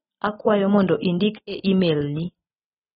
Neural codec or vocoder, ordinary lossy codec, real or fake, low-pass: none; AAC, 16 kbps; real; 19.8 kHz